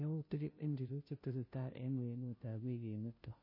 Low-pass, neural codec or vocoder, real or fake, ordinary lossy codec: 5.4 kHz; codec, 16 kHz, 0.5 kbps, FunCodec, trained on Chinese and English, 25 frames a second; fake; MP3, 24 kbps